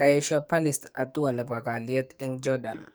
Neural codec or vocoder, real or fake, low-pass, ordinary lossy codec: codec, 44.1 kHz, 2.6 kbps, SNAC; fake; none; none